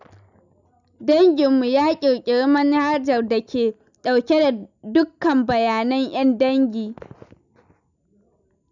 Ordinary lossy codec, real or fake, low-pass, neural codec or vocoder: none; real; 7.2 kHz; none